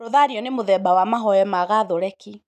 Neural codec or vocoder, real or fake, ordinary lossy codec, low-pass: none; real; none; 14.4 kHz